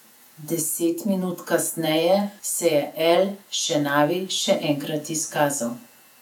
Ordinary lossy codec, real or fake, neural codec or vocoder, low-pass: none; fake; vocoder, 48 kHz, 128 mel bands, Vocos; 19.8 kHz